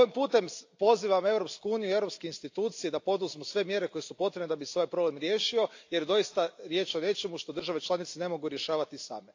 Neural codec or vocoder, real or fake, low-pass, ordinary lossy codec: none; real; 7.2 kHz; AAC, 48 kbps